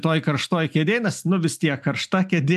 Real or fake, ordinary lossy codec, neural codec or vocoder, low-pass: real; AAC, 96 kbps; none; 14.4 kHz